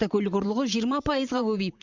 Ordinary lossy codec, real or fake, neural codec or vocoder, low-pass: Opus, 64 kbps; fake; vocoder, 44.1 kHz, 128 mel bands, Pupu-Vocoder; 7.2 kHz